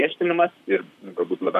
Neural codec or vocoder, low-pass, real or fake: vocoder, 44.1 kHz, 128 mel bands, Pupu-Vocoder; 14.4 kHz; fake